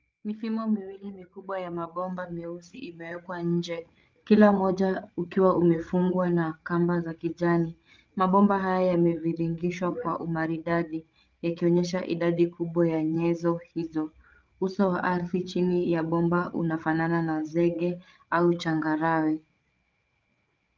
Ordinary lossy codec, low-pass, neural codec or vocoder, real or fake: Opus, 24 kbps; 7.2 kHz; codec, 16 kHz, 8 kbps, FreqCodec, larger model; fake